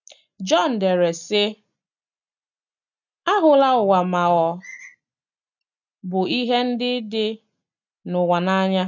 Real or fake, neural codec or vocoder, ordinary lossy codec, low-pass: real; none; none; 7.2 kHz